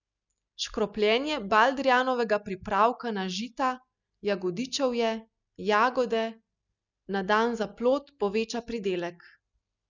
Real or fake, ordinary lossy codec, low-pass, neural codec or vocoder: real; none; 7.2 kHz; none